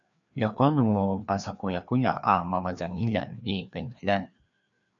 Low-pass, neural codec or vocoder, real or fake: 7.2 kHz; codec, 16 kHz, 2 kbps, FreqCodec, larger model; fake